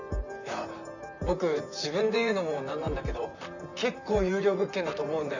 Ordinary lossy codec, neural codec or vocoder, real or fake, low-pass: none; vocoder, 44.1 kHz, 128 mel bands, Pupu-Vocoder; fake; 7.2 kHz